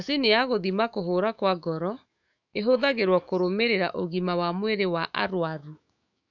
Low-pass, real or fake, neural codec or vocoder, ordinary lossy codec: 7.2 kHz; fake; autoencoder, 48 kHz, 128 numbers a frame, DAC-VAE, trained on Japanese speech; none